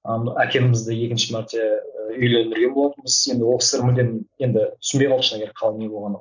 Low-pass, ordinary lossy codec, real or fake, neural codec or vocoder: 7.2 kHz; none; real; none